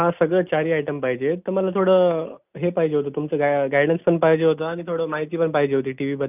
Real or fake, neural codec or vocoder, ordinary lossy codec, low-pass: real; none; none; 3.6 kHz